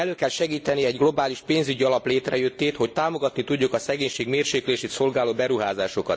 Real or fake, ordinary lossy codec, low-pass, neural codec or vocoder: real; none; none; none